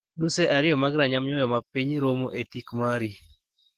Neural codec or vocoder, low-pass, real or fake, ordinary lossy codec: codec, 44.1 kHz, 7.8 kbps, DAC; 14.4 kHz; fake; Opus, 16 kbps